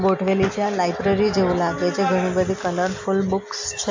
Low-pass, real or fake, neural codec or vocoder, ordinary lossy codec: 7.2 kHz; real; none; AAC, 48 kbps